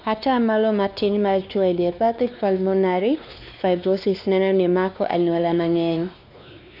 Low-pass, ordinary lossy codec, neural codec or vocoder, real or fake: 5.4 kHz; none; codec, 16 kHz, 2 kbps, X-Codec, WavLM features, trained on Multilingual LibriSpeech; fake